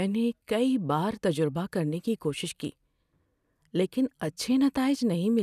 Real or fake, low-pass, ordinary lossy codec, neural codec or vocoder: real; 14.4 kHz; none; none